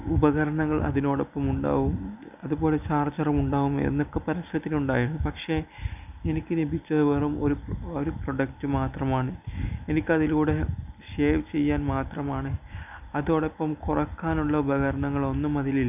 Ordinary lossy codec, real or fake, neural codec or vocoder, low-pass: none; real; none; 3.6 kHz